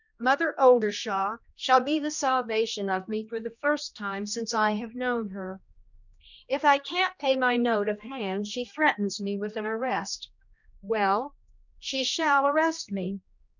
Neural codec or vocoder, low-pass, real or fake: codec, 16 kHz, 1 kbps, X-Codec, HuBERT features, trained on general audio; 7.2 kHz; fake